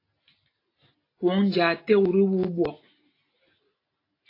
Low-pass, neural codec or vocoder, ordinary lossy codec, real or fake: 5.4 kHz; none; AAC, 24 kbps; real